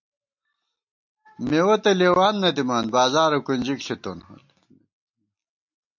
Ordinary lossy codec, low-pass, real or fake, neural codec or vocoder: MP3, 48 kbps; 7.2 kHz; real; none